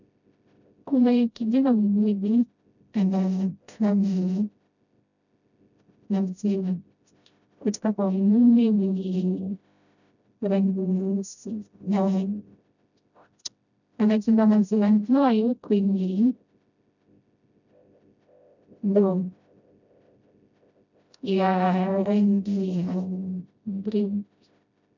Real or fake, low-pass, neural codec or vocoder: fake; 7.2 kHz; codec, 16 kHz, 0.5 kbps, FreqCodec, smaller model